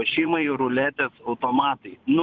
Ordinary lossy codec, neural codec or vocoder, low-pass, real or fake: Opus, 32 kbps; vocoder, 24 kHz, 100 mel bands, Vocos; 7.2 kHz; fake